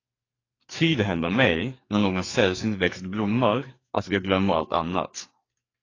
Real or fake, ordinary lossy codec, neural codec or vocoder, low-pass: fake; AAC, 32 kbps; codec, 44.1 kHz, 2.6 kbps, SNAC; 7.2 kHz